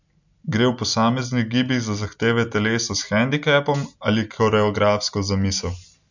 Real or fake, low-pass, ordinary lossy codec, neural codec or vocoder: real; 7.2 kHz; none; none